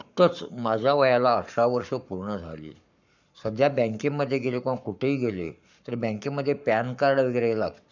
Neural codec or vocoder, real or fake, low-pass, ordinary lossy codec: codec, 44.1 kHz, 7.8 kbps, Pupu-Codec; fake; 7.2 kHz; none